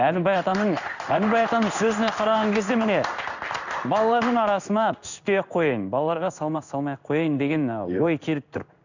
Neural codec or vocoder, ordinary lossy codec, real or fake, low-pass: codec, 16 kHz in and 24 kHz out, 1 kbps, XY-Tokenizer; none; fake; 7.2 kHz